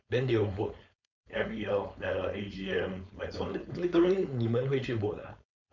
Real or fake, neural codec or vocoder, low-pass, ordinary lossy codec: fake; codec, 16 kHz, 4.8 kbps, FACodec; 7.2 kHz; none